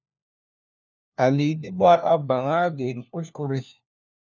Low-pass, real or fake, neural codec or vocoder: 7.2 kHz; fake; codec, 16 kHz, 1 kbps, FunCodec, trained on LibriTTS, 50 frames a second